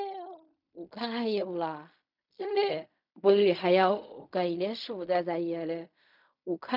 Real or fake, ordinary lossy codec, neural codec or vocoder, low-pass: fake; none; codec, 16 kHz in and 24 kHz out, 0.4 kbps, LongCat-Audio-Codec, fine tuned four codebook decoder; 5.4 kHz